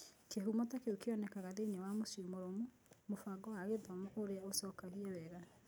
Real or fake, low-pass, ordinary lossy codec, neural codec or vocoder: real; none; none; none